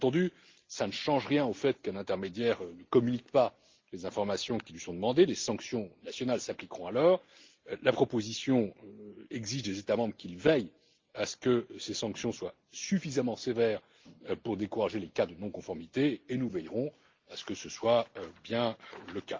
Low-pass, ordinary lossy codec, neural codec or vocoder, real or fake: 7.2 kHz; Opus, 32 kbps; none; real